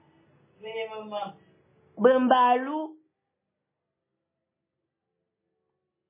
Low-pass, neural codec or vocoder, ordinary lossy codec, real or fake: 3.6 kHz; none; MP3, 16 kbps; real